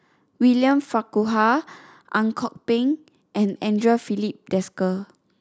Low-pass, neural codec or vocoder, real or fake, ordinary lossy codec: none; none; real; none